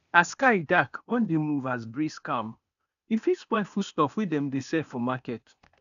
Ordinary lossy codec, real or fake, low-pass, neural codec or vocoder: none; fake; 7.2 kHz; codec, 16 kHz, 0.8 kbps, ZipCodec